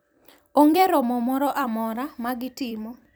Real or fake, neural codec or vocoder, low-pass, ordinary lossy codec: fake; vocoder, 44.1 kHz, 128 mel bands every 256 samples, BigVGAN v2; none; none